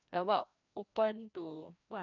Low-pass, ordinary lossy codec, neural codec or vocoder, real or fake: 7.2 kHz; none; codec, 16 kHz, 1 kbps, FreqCodec, larger model; fake